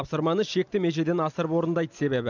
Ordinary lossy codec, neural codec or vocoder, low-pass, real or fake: none; none; 7.2 kHz; real